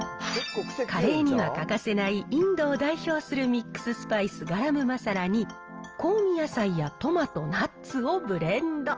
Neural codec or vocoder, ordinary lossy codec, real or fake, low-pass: none; Opus, 24 kbps; real; 7.2 kHz